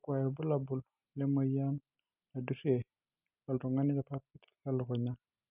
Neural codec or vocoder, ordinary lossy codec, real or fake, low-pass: none; none; real; 3.6 kHz